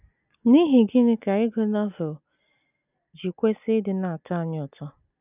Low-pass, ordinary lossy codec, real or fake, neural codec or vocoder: 3.6 kHz; none; real; none